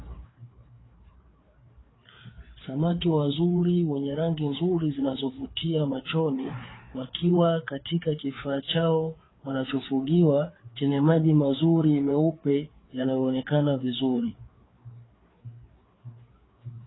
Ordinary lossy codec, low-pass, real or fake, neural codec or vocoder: AAC, 16 kbps; 7.2 kHz; fake; codec, 16 kHz, 4 kbps, FreqCodec, larger model